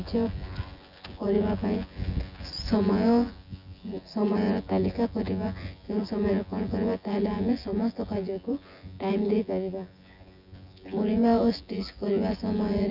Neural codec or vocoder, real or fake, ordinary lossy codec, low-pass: vocoder, 24 kHz, 100 mel bands, Vocos; fake; none; 5.4 kHz